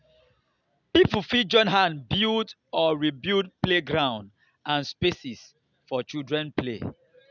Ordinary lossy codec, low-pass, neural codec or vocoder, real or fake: none; 7.2 kHz; none; real